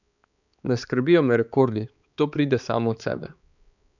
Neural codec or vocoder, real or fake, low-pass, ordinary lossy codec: codec, 16 kHz, 4 kbps, X-Codec, HuBERT features, trained on balanced general audio; fake; 7.2 kHz; none